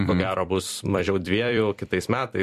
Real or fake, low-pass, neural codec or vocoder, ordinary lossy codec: fake; 14.4 kHz; vocoder, 44.1 kHz, 128 mel bands every 256 samples, BigVGAN v2; MP3, 64 kbps